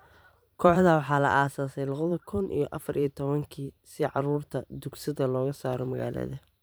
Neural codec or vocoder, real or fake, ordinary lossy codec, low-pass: vocoder, 44.1 kHz, 128 mel bands every 512 samples, BigVGAN v2; fake; none; none